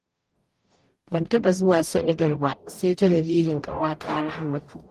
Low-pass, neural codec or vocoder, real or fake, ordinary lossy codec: 14.4 kHz; codec, 44.1 kHz, 0.9 kbps, DAC; fake; Opus, 24 kbps